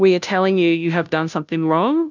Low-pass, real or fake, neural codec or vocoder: 7.2 kHz; fake; codec, 16 kHz, 0.5 kbps, FunCodec, trained on Chinese and English, 25 frames a second